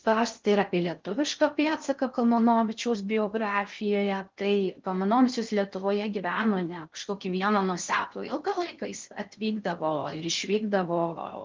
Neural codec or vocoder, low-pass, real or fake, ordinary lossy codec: codec, 16 kHz in and 24 kHz out, 0.6 kbps, FocalCodec, streaming, 4096 codes; 7.2 kHz; fake; Opus, 32 kbps